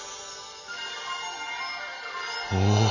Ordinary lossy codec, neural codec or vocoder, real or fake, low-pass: none; none; real; 7.2 kHz